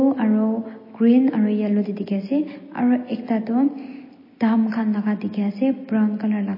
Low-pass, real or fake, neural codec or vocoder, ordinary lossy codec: 5.4 kHz; real; none; MP3, 24 kbps